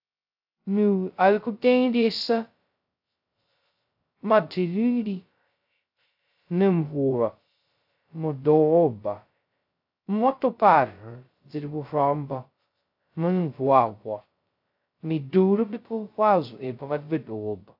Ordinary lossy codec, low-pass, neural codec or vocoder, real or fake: AAC, 48 kbps; 5.4 kHz; codec, 16 kHz, 0.2 kbps, FocalCodec; fake